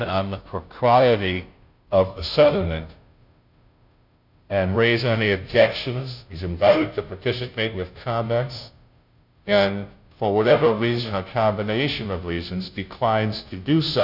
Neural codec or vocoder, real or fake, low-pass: codec, 16 kHz, 0.5 kbps, FunCodec, trained on Chinese and English, 25 frames a second; fake; 5.4 kHz